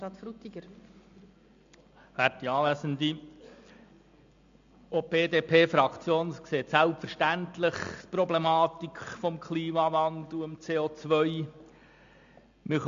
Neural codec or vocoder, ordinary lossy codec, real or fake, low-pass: none; AAC, 64 kbps; real; 7.2 kHz